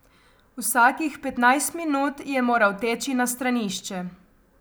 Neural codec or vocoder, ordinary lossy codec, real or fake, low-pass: none; none; real; none